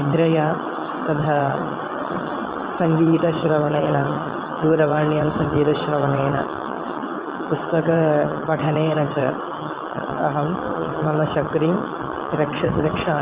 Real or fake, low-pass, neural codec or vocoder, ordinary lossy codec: fake; 3.6 kHz; vocoder, 22.05 kHz, 80 mel bands, WaveNeXt; Opus, 24 kbps